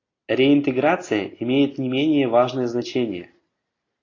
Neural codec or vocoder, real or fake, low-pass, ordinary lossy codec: none; real; 7.2 kHz; AAC, 32 kbps